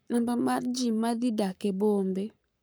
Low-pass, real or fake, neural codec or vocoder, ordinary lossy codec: none; fake; codec, 44.1 kHz, 7.8 kbps, Pupu-Codec; none